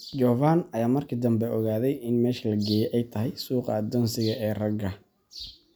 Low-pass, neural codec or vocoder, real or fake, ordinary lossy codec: none; none; real; none